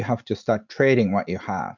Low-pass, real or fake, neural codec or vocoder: 7.2 kHz; real; none